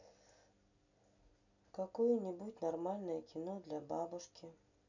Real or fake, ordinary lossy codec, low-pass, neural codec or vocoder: real; none; 7.2 kHz; none